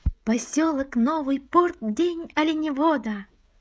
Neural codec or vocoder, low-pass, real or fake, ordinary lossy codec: codec, 16 kHz, 16 kbps, FreqCodec, smaller model; none; fake; none